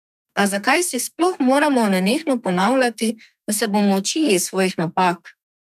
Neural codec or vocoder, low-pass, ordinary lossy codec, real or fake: codec, 32 kHz, 1.9 kbps, SNAC; 14.4 kHz; none; fake